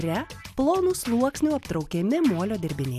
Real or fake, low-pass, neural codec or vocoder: real; 14.4 kHz; none